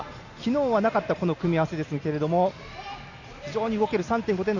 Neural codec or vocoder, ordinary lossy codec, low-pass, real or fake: none; none; 7.2 kHz; real